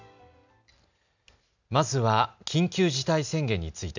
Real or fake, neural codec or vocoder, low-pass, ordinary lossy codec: real; none; 7.2 kHz; none